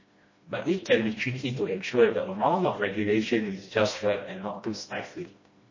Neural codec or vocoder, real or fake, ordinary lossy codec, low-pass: codec, 16 kHz, 1 kbps, FreqCodec, smaller model; fake; MP3, 32 kbps; 7.2 kHz